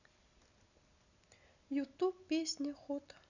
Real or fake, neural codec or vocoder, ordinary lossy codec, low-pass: real; none; none; 7.2 kHz